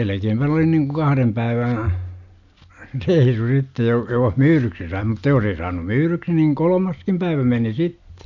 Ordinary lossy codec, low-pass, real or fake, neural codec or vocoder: none; 7.2 kHz; real; none